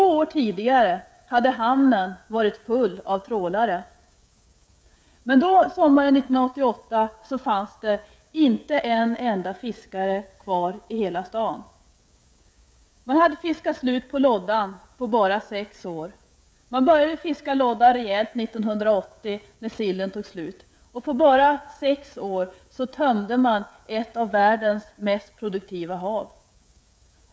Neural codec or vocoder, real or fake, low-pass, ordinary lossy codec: codec, 16 kHz, 16 kbps, FreqCodec, smaller model; fake; none; none